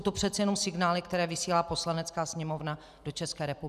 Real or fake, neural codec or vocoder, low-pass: fake; vocoder, 44.1 kHz, 128 mel bands every 512 samples, BigVGAN v2; 14.4 kHz